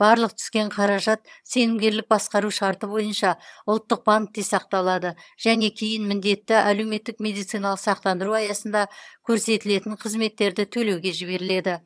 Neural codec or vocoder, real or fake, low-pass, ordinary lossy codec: vocoder, 22.05 kHz, 80 mel bands, HiFi-GAN; fake; none; none